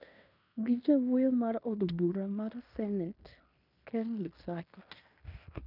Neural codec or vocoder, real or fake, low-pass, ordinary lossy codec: codec, 16 kHz in and 24 kHz out, 0.9 kbps, LongCat-Audio-Codec, fine tuned four codebook decoder; fake; 5.4 kHz; none